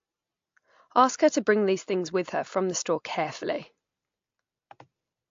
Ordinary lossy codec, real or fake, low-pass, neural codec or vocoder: AAC, 48 kbps; real; 7.2 kHz; none